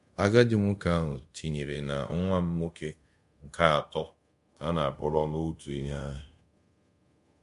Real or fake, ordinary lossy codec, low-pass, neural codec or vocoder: fake; MP3, 64 kbps; 10.8 kHz; codec, 24 kHz, 0.5 kbps, DualCodec